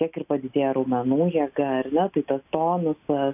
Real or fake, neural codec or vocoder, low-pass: real; none; 3.6 kHz